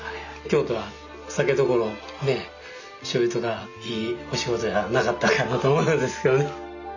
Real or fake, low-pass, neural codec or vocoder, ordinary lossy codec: real; 7.2 kHz; none; none